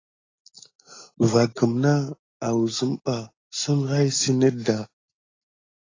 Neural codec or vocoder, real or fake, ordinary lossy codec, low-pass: none; real; AAC, 32 kbps; 7.2 kHz